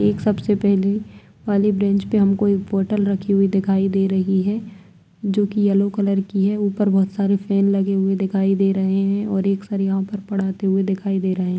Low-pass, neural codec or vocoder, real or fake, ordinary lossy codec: none; none; real; none